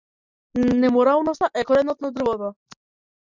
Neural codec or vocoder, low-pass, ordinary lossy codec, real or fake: none; 7.2 kHz; Opus, 64 kbps; real